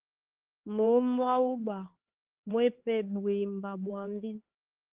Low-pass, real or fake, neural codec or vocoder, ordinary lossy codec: 3.6 kHz; fake; codec, 16 kHz, 4 kbps, X-Codec, HuBERT features, trained on LibriSpeech; Opus, 16 kbps